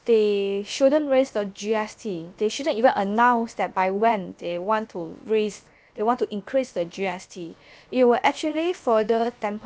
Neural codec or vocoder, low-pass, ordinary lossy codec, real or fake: codec, 16 kHz, about 1 kbps, DyCAST, with the encoder's durations; none; none; fake